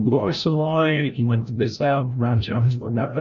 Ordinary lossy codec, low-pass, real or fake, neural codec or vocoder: MP3, 96 kbps; 7.2 kHz; fake; codec, 16 kHz, 0.5 kbps, FreqCodec, larger model